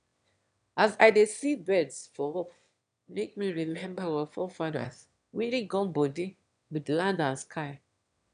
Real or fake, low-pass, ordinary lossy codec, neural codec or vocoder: fake; 9.9 kHz; none; autoencoder, 22.05 kHz, a latent of 192 numbers a frame, VITS, trained on one speaker